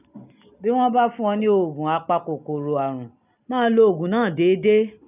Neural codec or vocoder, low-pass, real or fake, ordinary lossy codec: none; 3.6 kHz; real; none